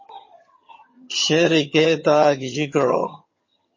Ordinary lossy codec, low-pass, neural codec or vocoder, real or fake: MP3, 32 kbps; 7.2 kHz; vocoder, 22.05 kHz, 80 mel bands, HiFi-GAN; fake